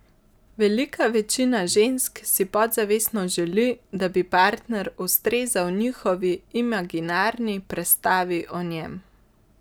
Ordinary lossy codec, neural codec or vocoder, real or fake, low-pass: none; none; real; none